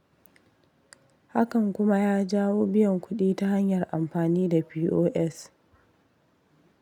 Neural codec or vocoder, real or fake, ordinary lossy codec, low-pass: none; real; none; 19.8 kHz